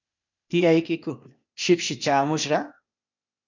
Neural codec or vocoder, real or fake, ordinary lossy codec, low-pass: codec, 16 kHz, 0.8 kbps, ZipCodec; fake; MP3, 64 kbps; 7.2 kHz